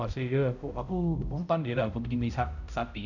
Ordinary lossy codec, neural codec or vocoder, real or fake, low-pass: none; codec, 16 kHz, 0.5 kbps, X-Codec, HuBERT features, trained on balanced general audio; fake; 7.2 kHz